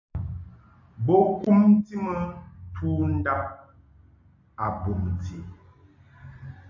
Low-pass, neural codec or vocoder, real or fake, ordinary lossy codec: 7.2 kHz; none; real; AAC, 48 kbps